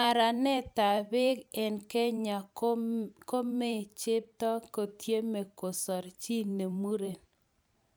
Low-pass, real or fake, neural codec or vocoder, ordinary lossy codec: none; fake; vocoder, 44.1 kHz, 128 mel bands every 512 samples, BigVGAN v2; none